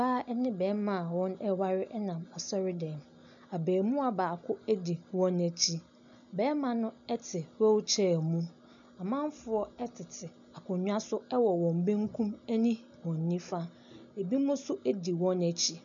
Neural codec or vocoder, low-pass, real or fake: none; 7.2 kHz; real